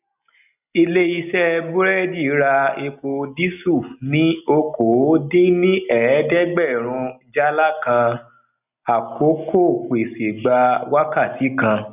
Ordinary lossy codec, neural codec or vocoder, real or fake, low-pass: none; none; real; 3.6 kHz